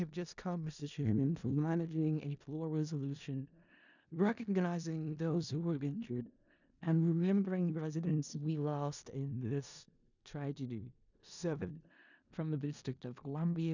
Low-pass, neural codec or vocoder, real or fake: 7.2 kHz; codec, 16 kHz in and 24 kHz out, 0.4 kbps, LongCat-Audio-Codec, four codebook decoder; fake